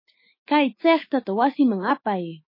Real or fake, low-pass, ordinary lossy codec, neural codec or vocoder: fake; 5.4 kHz; MP3, 24 kbps; autoencoder, 48 kHz, 128 numbers a frame, DAC-VAE, trained on Japanese speech